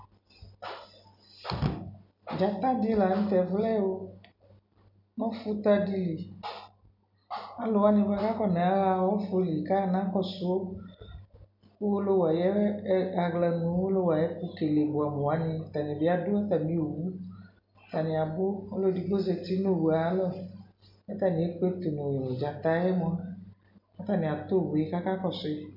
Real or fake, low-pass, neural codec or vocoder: real; 5.4 kHz; none